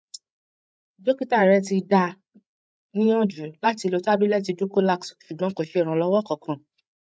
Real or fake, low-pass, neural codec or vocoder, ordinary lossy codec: fake; none; codec, 16 kHz, 8 kbps, FreqCodec, larger model; none